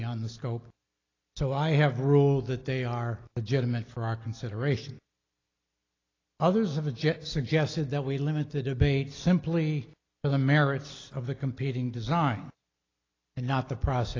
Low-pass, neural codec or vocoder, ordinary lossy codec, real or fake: 7.2 kHz; none; AAC, 32 kbps; real